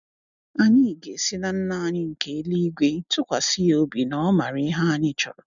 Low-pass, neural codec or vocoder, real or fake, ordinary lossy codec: 7.2 kHz; none; real; none